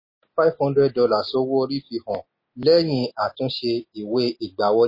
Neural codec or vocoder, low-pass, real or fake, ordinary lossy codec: none; 5.4 kHz; real; MP3, 24 kbps